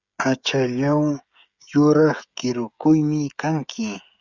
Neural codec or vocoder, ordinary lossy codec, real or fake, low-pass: codec, 16 kHz, 16 kbps, FreqCodec, smaller model; Opus, 64 kbps; fake; 7.2 kHz